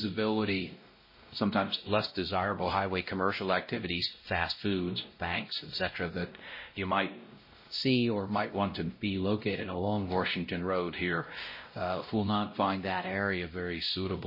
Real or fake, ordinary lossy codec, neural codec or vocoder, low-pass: fake; MP3, 24 kbps; codec, 16 kHz, 0.5 kbps, X-Codec, WavLM features, trained on Multilingual LibriSpeech; 5.4 kHz